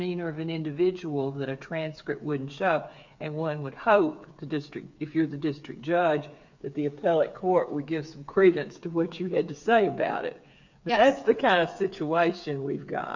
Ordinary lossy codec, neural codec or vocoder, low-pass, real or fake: MP3, 64 kbps; codec, 16 kHz, 8 kbps, FreqCodec, smaller model; 7.2 kHz; fake